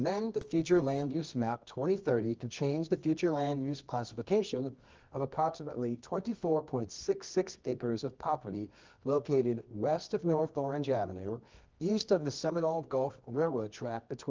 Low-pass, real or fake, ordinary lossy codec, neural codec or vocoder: 7.2 kHz; fake; Opus, 24 kbps; codec, 24 kHz, 0.9 kbps, WavTokenizer, medium music audio release